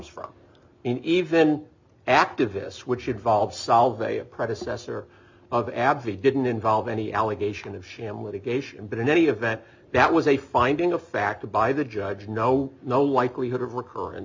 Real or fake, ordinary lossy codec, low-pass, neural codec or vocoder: real; MP3, 64 kbps; 7.2 kHz; none